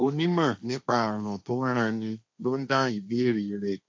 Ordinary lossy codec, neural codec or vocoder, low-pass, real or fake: none; codec, 16 kHz, 1.1 kbps, Voila-Tokenizer; none; fake